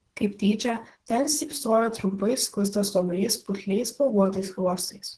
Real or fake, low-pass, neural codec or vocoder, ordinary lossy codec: fake; 10.8 kHz; codec, 24 kHz, 3 kbps, HILCodec; Opus, 16 kbps